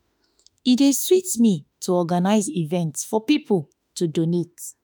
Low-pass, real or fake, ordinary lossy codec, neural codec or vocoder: none; fake; none; autoencoder, 48 kHz, 32 numbers a frame, DAC-VAE, trained on Japanese speech